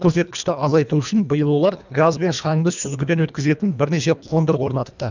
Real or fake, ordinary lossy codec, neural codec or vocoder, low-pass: fake; none; codec, 24 kHz, 1.5 kbps, HILCodec; 7.2 kHz